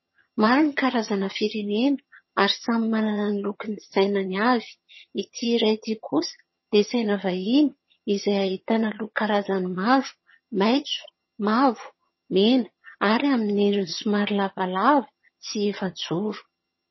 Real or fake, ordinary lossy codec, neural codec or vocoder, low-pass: fake; MP3, 24 kbps; vocoder, 22.05 kHz, 80 mel bands, HiFi-GAN; 7.2 kHz